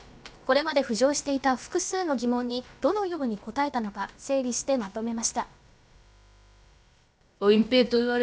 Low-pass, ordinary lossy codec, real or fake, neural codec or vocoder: none; none; fake; codec, 16 kHz, about 1 kbps, DyCAST, with the encoder's durations